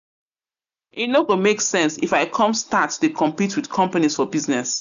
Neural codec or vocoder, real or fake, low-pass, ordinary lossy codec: none; real; 7.2 kHz; none